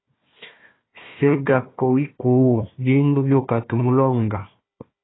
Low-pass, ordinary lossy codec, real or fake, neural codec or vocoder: 7.2 kHz; AAC, 16 kbps; fake; codec, 16 kHz, 1 kbps, FunCodec, trained on Chinese and English, 50 frames a second